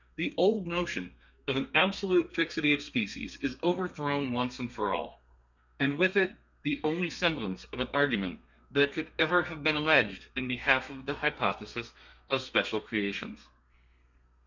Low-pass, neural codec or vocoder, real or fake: 7.2 kHz; codec, 32 kHz, 1.9 kbps, SNAC; fake